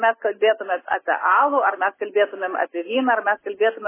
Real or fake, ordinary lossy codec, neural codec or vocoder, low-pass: real; MP3, 16 kbps; none; 3.6 kHz